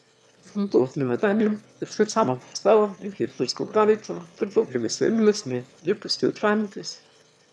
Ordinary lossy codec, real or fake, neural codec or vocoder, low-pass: none; fake; autoencoder, 22.05 kHz, a latent of 192 numbers a frame, VITS, trained on one speaker; none